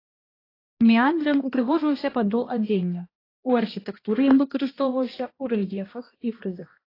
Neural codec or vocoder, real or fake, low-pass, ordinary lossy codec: codec, 16 kHz, 1 kbps, X-Codec, HuBERT features, trained on balanced general audio; fake; 5.4 kHz; AAC, 24 kbps